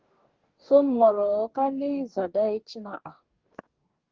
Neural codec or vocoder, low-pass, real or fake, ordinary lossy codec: codec, 44.1 kHz, 2.6 kbps, DAC; 7.2 kHz; fake; Opus, 16 kbps